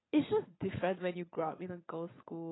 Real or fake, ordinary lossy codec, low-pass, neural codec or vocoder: fake; AAC, 16 kbps; 7.2 kHz; vocoder, 22.05 kHz, 80 mel bands, Vocos